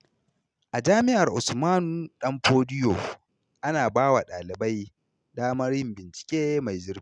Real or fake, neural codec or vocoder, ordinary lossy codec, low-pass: real; none; none; 9.9 kHz